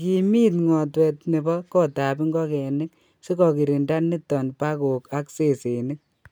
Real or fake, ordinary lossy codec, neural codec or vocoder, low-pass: real; none; none; none